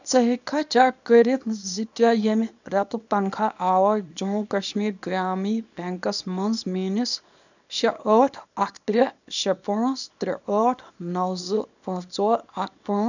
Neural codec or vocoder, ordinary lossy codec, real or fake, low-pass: codec, 24 kHz, 0.9 kbps, WavTokenizer, small release; none; fake; 7.2 kHz